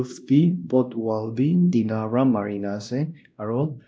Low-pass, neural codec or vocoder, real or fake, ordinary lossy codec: none; codec, 16 kHz, 1 kbps, X-Codec, WavLM features, trained on Multilingual LibriSpeech; fake; none